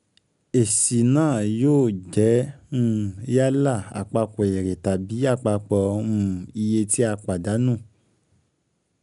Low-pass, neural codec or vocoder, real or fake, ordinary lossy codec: 10.8 kHz; none; real; none